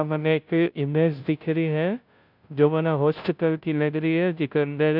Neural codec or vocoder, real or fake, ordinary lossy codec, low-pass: codec, 16 kHz, 0.5 kbps, FunCodec, trained on Chinese and English, 25 frames a second; fake; none; 5.4 kHz